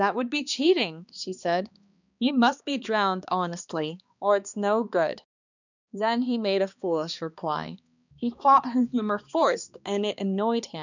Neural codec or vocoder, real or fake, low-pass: codec, 16 kHz, 2 kbps, X-Codec, HuBERT features, trained on balanced general audio; fake; 7.2 kHz